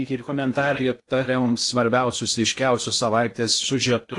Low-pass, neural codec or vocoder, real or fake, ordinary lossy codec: 10.8 kHz; codec, 16 kHz in and 24 kHz out, 0.6 kbps, FocalCodec, streaming, 2048 codes; fake; AAC, 48 kbps